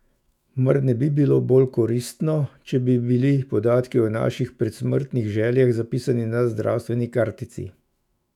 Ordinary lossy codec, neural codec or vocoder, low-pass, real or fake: none; autoencoder, 48 kHz, 128 numbers a frame, DAC-VAE, trained on Japanese speech; 19.8 kHz; fake